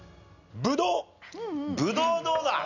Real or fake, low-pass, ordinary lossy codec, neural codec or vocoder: real; 7.2 kHz; none; none